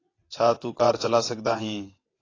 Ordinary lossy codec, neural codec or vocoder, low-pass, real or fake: AAC, 32 kbps; vocoder, 22.05 kHz, 80 mel bands, WaveNeXt; 7.2 kHz; fake